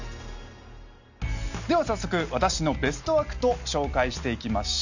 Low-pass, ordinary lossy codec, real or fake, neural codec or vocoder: 7.2 kHz; none; real; none